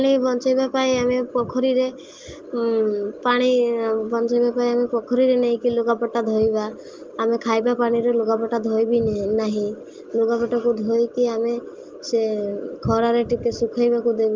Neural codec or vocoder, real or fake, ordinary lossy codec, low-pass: none; real; Opus, 32 kbps; 7.2 kHz